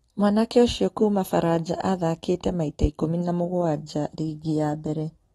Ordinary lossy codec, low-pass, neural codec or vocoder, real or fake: AAC, 32 kbps; 19.8 kHz; none; real